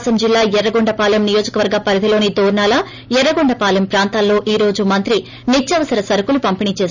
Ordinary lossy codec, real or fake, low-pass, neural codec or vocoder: none; real; 7.2 kHz; none